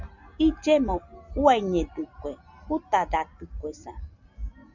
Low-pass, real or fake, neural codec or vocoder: 7.2 kHz; real; none